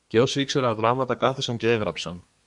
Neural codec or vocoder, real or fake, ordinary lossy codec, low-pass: codec, 24 kHz, 1 kbps, SNAC; fake; MP3, 96 kbps; 10.8 kHz